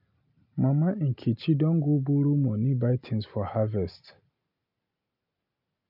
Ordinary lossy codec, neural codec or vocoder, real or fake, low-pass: none; none; real; 5.4 kHz